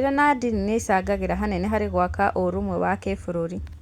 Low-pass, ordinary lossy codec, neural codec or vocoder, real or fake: 19.8 kHz; Opus, 64 kbps; none; real